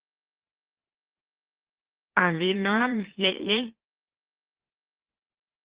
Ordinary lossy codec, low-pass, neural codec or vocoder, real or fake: Opus, 16 kbps; 3.6 kHz; autoencoder, 44.1 kHz, a latent of 192 numbers a frame, MeloTTS; fake